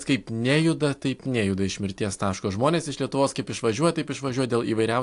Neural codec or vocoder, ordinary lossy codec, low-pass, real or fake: none; AAC, 64 kbps; 10.8 kHz; real